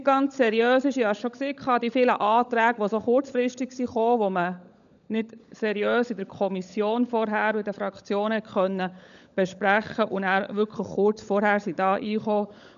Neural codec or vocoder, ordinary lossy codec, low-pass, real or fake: codec, 16 kHz, 16 kbps, FunCodec, trained on LibriTTS, 50 frames a second; none; 7.2 kHz; fake